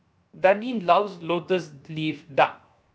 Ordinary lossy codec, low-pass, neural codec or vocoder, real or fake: none; none; codec, 16 kHz, 0.7 kbps, FocalCodec; fake